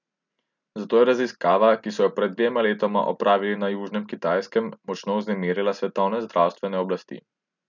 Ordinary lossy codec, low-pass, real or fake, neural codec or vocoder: none; 7.2 kHz; real; none